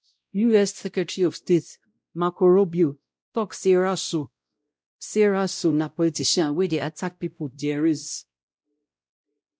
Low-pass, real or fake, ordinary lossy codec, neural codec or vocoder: none; fake; none; codec, 16 kHz, 0.5 kbps, X-Codec, WavLM features, trained on Multilingual LibriSpeech